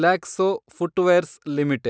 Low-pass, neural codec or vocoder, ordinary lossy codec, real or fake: none; none; none; real